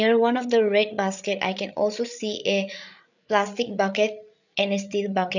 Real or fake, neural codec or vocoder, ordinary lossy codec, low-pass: fake; codec, 16 kHz, 8 kbps, FreqCodec, larger model; none; 7.2 kHz